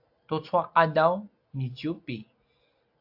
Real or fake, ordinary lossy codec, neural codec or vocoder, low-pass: real; AAC, 48 kbps; none; 5.4 kHz